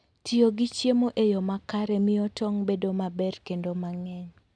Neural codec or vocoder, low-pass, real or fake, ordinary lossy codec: none; none; real; none